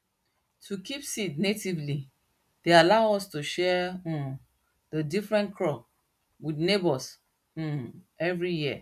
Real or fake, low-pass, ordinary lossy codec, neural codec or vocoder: real; 14.4 kHz; none; none